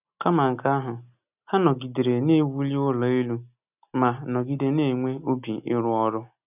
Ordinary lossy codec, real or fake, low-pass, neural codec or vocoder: none; real; 3.6 kHz; none